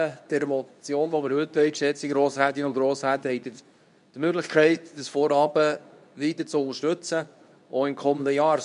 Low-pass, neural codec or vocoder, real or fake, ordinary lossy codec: 10.8 kHz; codec, 24 kHz, 0.9 kbps, WavTokenizer, medium speech release version 2; fake; none